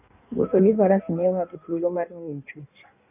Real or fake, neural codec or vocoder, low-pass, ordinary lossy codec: fake; codec, 16 kHz in and 24 kHz out, 1.1 kbps, FireRedTTS-2 codec; 3.6 kHz; none